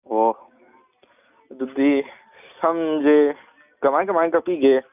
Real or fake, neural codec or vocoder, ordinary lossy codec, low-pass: real; none; none; 3.6 kHz